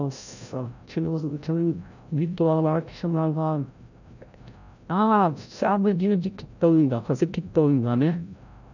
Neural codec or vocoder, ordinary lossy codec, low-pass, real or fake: codec, 16 kHz, 0.5 kbps, FreqCodec, larger model; none; 7.2 kHz; fake